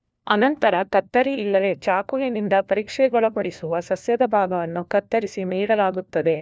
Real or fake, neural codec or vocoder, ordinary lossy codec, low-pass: fake; codec, 16 kHz, 1 kbps, FunCodec, trained on LibriTTS, 50 frames a second; none; none